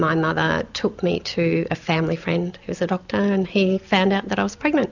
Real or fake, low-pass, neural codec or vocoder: real; 7.2 kHz; none